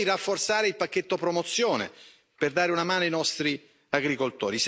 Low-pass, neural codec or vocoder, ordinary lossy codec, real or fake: none; none; none; real